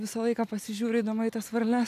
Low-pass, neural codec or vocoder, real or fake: 14.4 kHz; none; real